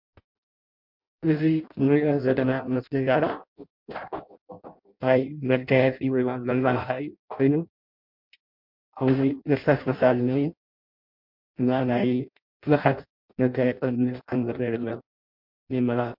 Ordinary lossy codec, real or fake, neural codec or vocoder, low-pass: MP3, 48 kbps; fake; codec, 16 kHz in and 24 kHz out, 0.6 kbps, FireRedTTS-2 codec; 5.4 kHz